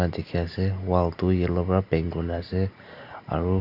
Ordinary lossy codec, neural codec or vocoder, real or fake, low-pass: none; none; real; 5.4 kHz